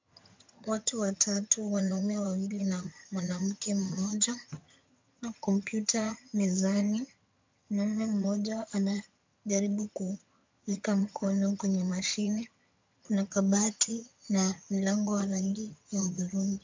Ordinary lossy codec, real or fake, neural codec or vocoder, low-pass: MP3, 48 kbps; fake; vocoder, 22.05 kHz, 80 mel bands, HiFi-GAN; 7.2 kHz